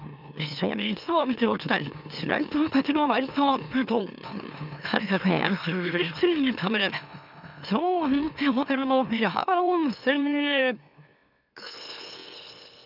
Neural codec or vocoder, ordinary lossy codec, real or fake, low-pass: autoencoder, 44.1 kHz, a latent of 192 numbers a frame, MeloTTS; none; fake; 5.4 kHz